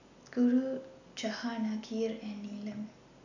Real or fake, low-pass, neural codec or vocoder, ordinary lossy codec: real; 7.2 kHz; none; none